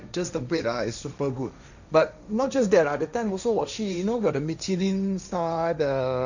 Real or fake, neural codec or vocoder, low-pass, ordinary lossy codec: fake; codec, 16 kHz, 1.1 kbps, Voila-Tokenizer; 7.2 kHz; none